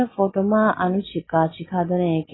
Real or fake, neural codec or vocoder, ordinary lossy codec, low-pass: real; none; AAC, 16 kbps; 7.2 kHz